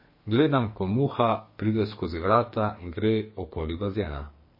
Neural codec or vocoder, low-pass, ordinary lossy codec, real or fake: codec, 44.1 kHz, 2.6 kbps, SNAC; 5.4 kHz; MP3, 24 kbps; fake